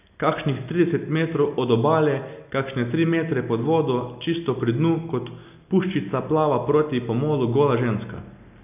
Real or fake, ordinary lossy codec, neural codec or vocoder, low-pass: real; none; none; 3.6 kHz